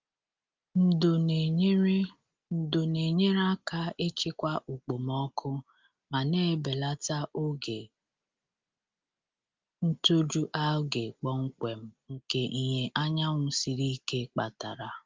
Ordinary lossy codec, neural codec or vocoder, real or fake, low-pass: Opus, 32 kbps; none; real; 7.2 kHz